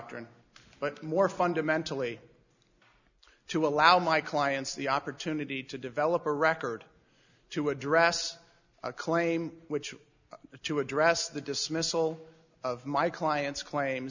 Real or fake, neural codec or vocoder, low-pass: real; none; 7.2 kHz